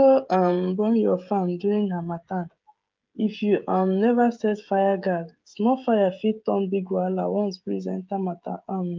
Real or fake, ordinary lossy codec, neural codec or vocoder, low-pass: fake; Opus, 24 kbps; codec, 16 kHz, 16 kbps, FreqCodec, smaller model; 7.2 kHz